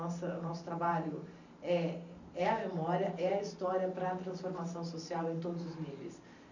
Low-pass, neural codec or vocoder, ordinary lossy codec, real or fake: 7.2 kHz; vocoder, 44.1 kHz, 128 mel bands every 512 samples, BigVGAN v2; none; fake